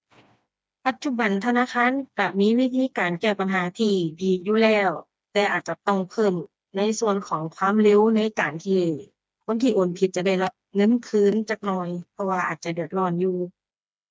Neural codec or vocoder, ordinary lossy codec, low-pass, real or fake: codec, 16 kHz, 2 kbps, FreqCodec, smaller model; none; none; fake